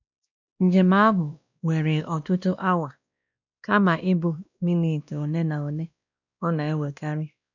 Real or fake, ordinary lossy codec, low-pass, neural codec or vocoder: fake; none; 7.2 kHz; codec, 16 kHz, 1 kbps, X-Codec, WavLM features, trained on Multilingual LibriSpeech